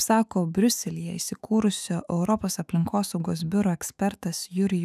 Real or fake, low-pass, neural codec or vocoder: fake; 14.4 kHz; autoencoder, 48 kHz, 128 numbers a frame, DAC-VAE, trained on Japanese speech